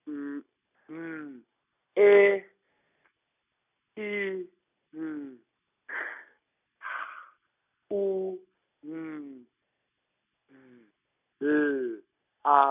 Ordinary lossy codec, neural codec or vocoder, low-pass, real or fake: none; none; 3.6 kHz; real